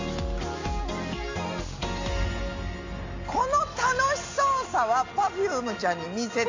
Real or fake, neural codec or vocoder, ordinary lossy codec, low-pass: real; none; AAC, 48 kbps; 7.2 kHz